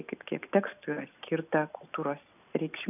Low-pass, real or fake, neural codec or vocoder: 3.6 kHz; real; none